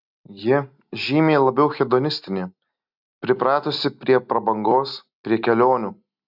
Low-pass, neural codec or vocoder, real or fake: 5.4 kHz; none; real